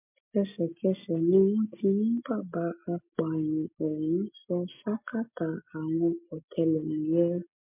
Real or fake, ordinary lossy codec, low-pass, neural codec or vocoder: real; MP3, 32 kbps; 3.6 kHz; none